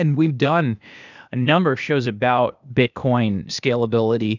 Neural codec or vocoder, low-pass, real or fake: codec, 16 kHz, 0.8 kbps, ZipCodec; 7.2 kHz; fake